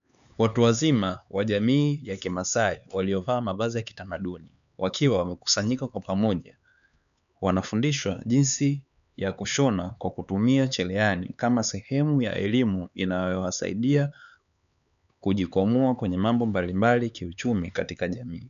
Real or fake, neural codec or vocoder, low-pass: fake; codec, 16 kHz, 4 kbps, X-Codec, HuBERT features, trained on LibriSpeech; 7.2 kHz